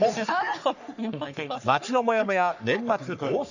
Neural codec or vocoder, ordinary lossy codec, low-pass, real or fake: codec, 44.1 kHz, 3.4 kbps, Pupu-Codec; none; 7.2 kHz; fake